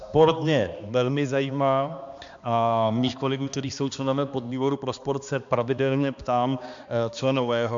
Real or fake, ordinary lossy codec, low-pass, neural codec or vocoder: fake; MP3, 64 kbps; 7.2 kHz; codec, 16 kHz, 2 kbps, X-Codec, HuBERT features, trained on balanced general audio